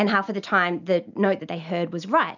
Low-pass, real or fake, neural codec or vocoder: 7.2 kHz; real; none